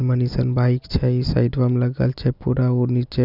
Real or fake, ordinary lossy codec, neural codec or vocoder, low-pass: real; none; none; 5.4 kHz